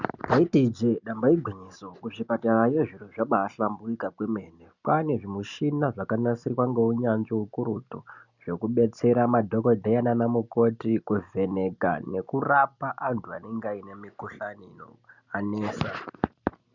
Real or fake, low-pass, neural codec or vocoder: real; 7.2 kHz; none